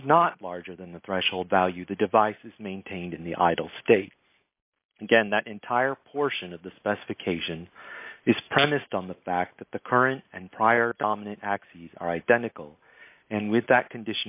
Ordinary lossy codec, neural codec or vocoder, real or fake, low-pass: AAC, 32 kbps; none; real; 3.6 kHz